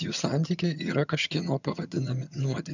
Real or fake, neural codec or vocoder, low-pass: fake; vocoder, 22.05 kHz, 80 mel bands, HiFi-GAN; 7.2 kHz